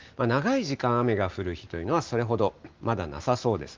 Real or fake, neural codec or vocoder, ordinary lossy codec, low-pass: real; none; Opus, 24 kbps; 7.2 kHz